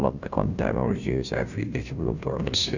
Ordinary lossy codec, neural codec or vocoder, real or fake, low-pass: none; codec, 16 kHz, 0.5 kbps, FunCodec, trained on Chinese and English, 25 frames a second; fake; 7.2 kHz